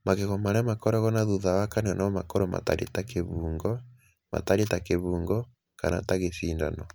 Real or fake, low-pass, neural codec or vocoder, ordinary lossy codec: real; none; none; none